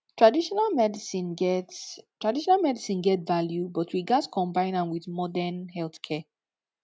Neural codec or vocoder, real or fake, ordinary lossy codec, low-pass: none; real; none; none